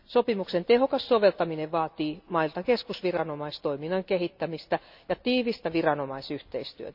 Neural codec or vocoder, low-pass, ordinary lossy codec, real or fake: none; 5.4 kHz; none; real